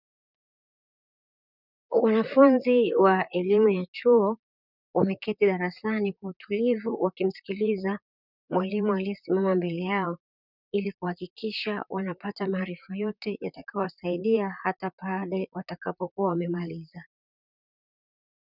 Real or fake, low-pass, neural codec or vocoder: fake; 5.4 kHz; vocoder, 44.1 kHz, 128 mel bands, Pupu-Vocoder